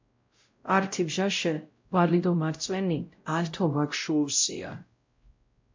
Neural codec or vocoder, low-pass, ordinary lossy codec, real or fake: codec, 16 kHz, 0.5 kbps, X-Codec, WavLM features, trained on Multilingual LibriSpeech; 7.2 kHz; MP3, 48 kbps; fake